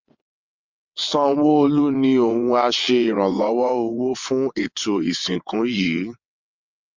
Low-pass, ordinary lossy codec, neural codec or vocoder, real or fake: 7.2 kHz; MP3, 64 kbps; vocoder, 22.05 kHz, 80 mel bands, WaveNeXt; fake